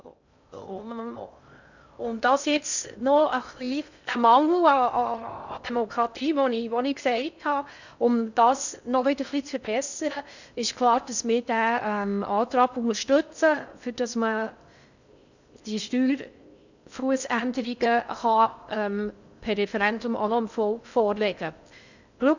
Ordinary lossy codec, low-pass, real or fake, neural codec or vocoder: none; 7.2 kHz; fake; codec, 16 kHz in and 24 kHz out, 0.6 kbps, FocalCodec, streaming, 2048 codes